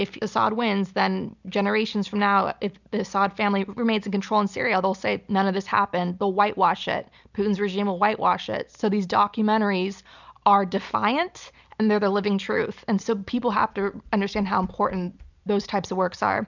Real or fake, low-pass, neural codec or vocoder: real; 7.2 kHz; none